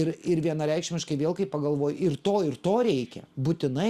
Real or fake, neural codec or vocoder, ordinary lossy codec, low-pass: real; none; Opus, 64 kbps; 14.4 kHz